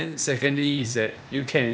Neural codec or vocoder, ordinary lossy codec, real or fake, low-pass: codec, 16 kHz, 0.8 kbps, ZipCodec; none; fake; none